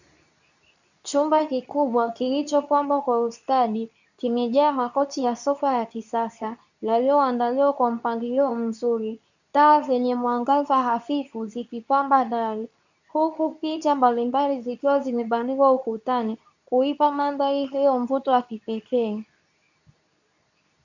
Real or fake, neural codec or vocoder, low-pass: fake; codec, 24 kHz, 0.9 kbps, WavTokenizer, medium speech release version 2; 7.2 kHz